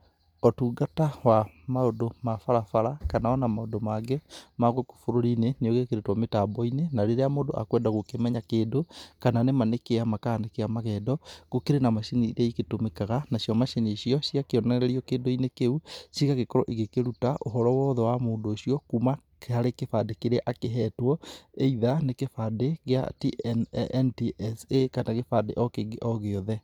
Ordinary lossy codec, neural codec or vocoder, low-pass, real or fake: none; none; 19.8 kHz; real